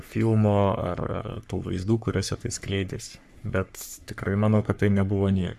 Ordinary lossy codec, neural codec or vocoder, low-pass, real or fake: AAC, 96 kbps; codec, 44.1 kHz, 3.4 kbps, Pupu-Codec; 14.4 kHz; fake